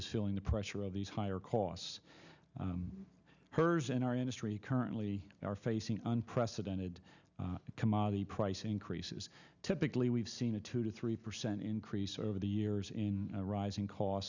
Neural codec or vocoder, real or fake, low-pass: none; real; 7.2 kHz